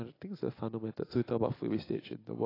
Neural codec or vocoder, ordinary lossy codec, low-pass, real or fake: none; AAC, 24 kbps; 5.4 kHz; real